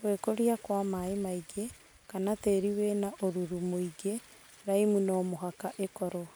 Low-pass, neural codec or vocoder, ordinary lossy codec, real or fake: none; none; none; real